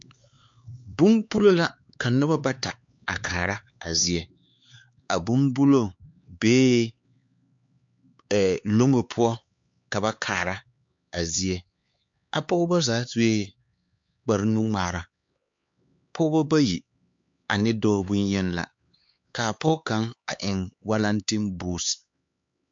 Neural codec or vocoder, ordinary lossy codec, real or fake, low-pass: codec, 16 kHz, 4 kbps, X-Codec, HuBERT features, trained on LibriSpeech; MP3, 48 kbps; fake; 7.2 kHz